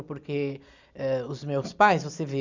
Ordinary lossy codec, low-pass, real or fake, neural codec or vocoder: Opus, 64 kbps; 7.2 kHz; real; none